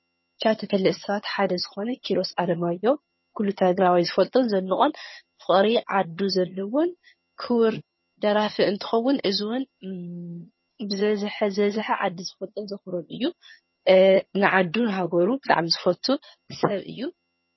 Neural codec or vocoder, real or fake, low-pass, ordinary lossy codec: vocoder, 22.05 kHz, 80 mel bands, HiFi-GAN; fake; 7.2 kHz; MP3, 24 kbps